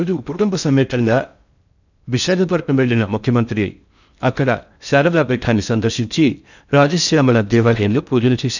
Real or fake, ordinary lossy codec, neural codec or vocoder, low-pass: fake; none; codec, 16 kHz in and 24 kHz out, 0.6 kbps, FocalCodec, streaming, 4096 codes; 7.2 kHz